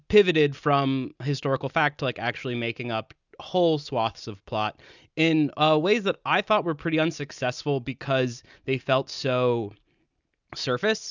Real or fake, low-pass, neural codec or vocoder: real; 7.2 kHz; none